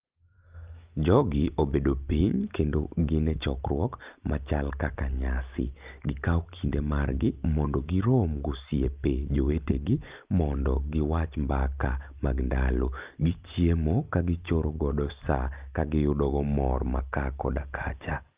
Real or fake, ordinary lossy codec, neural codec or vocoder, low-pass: real; Opus, 24 kbps; none; 3.6 kHz